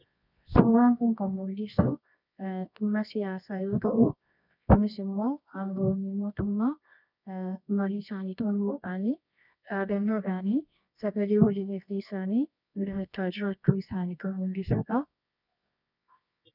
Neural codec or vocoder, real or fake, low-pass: codec, 24 kHz, 0.9 kbps, WavTokenizer, medium music audio release; fake; 5.4 kHz